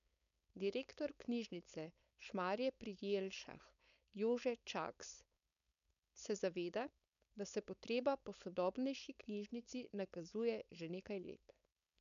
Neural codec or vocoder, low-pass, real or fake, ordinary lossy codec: codec, 16 kHz, 4.8 kbps, FACodec; 7.2 kHz; fake; none